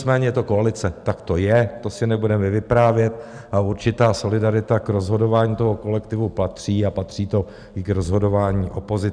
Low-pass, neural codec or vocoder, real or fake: 9.9 kHz; none; real